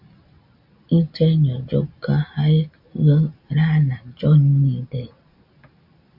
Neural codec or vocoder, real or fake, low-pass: none; real; 5.4 kHz